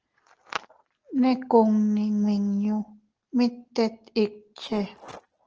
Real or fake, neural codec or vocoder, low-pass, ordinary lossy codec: real; none; 7.2 kHz; Opus, 16 kbps